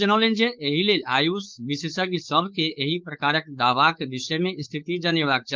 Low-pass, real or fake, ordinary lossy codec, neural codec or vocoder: 7.2 kHz; fake; Opus, 32 kbps; codec, 16 kHz, 4.8 kbps, FACodec